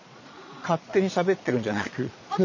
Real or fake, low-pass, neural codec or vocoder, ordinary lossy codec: fake; 7.2 kHz; vocoder, 22.05 kHz, 80 mel bands, Vocos; none